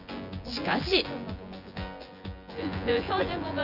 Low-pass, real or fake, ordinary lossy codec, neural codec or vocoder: 5.4 kHz; fake; none; vocoder, 24 kHz, 100 mel bands, Vocos